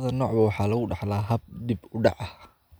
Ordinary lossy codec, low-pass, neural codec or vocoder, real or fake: none; none; none; real